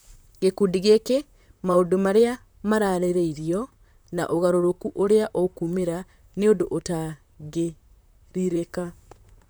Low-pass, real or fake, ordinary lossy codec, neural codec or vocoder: none; fake; none; vocoder, 44.1 kHz, 128 mel bands, Pupu-Vocoder